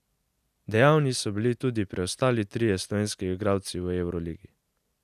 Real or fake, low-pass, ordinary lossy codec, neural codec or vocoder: real; 14.4 kHz; none; none